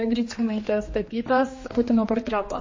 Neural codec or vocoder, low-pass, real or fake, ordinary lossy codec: codec, 16 kHz, 2 kbps, X-Codec, HuBERT features, trained on general audio; 7.2 kHz; fake; MP3, 32 kbps